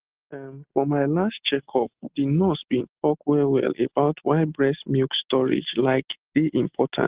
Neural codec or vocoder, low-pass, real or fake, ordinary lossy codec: none; 3.6 kHz; real; Opus, 16 kbps